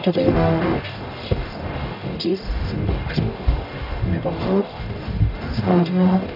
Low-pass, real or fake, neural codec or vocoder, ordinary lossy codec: 5.4 kHz; fake; codec, 44.1 kHz, 0.9 kbps, DAC; none